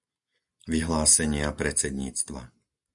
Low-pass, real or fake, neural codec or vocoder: 10.8 kHz; real; none